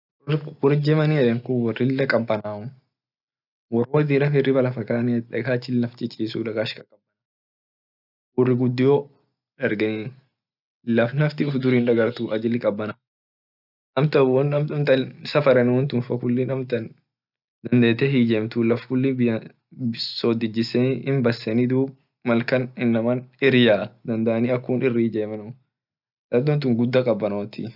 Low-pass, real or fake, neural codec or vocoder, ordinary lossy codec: 5.4 kHz; real; none; none